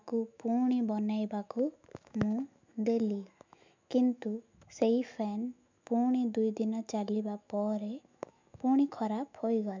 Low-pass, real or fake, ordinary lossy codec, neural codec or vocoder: 7.2 kHz; fake; MP3, 64 kbps; autoencoder, 48 kHz, 128 numbers a frame, DAC-VAE, trained on Japanese speech